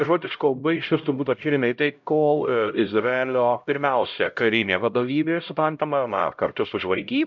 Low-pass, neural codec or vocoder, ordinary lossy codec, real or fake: 7.2 kHz; codec, 16 kHz, 0.5 kbps, X-Codec, HuBERT features, trained on LibriSpeech; MP3, 64 kbps; fake